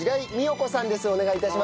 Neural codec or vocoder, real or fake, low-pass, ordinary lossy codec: none; real; none; none